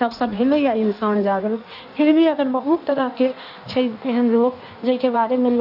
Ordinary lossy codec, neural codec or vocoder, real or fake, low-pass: none; codec, 16 kHz in and 24 kHz out, 1.1 kbps, FireRedTTS-2 codec; fake; 5.4 kHz